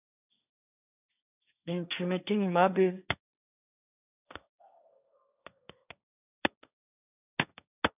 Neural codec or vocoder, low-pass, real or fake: codec, 16 kHz, 1.1 kbps, Voila-Tokenizer; 3.6 kHz; fake